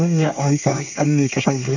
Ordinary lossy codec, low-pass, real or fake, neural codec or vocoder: none; 7.2 kHz; fake; codec, 24 kHz, 1 kbps, SNAC